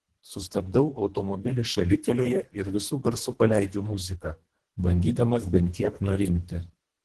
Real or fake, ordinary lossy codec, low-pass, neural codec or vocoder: fake; Opus, 16 kbps; 10.8 kHz; codec, 24 kHz, 1.5 kbps, HILCodec